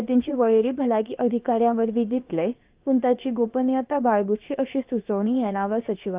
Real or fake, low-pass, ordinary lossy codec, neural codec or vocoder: fake; 3.6 kHz; Opus, 32 kbps; codec, 16 kHz, about 1 kbps, DyCAST, with the encoder's durations